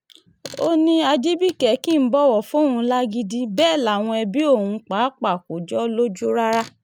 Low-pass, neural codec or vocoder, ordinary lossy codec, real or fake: 19.8 kHz; none; none; real